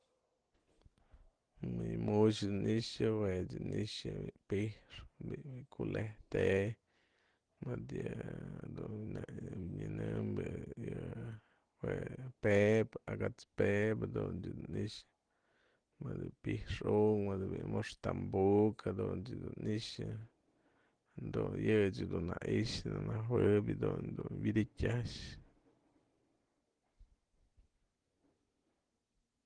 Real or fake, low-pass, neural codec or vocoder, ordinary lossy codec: real; 9.9 kHz; none; Opus, 16 kbps